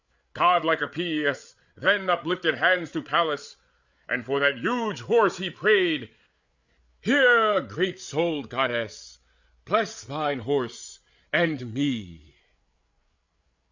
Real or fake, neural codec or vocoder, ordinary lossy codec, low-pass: fake; codec, 16 kHz, 8 kbps, FreqCodec, larger model; Opus, 64 kbps; 7.2 kHz